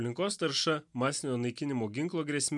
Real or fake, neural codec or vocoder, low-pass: real; none; 10.8 kHz